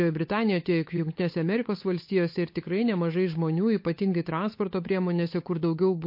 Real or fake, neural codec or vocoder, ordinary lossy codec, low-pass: fake; codec, 16 kHz, 8 kbps, FunCodec, trained on Chinese and English, 25 frames a second; MP3, 32 kbps; 5.4 kHz